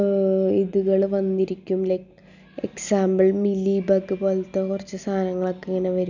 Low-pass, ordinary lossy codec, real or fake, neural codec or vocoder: 7.2 kHz; none; real; none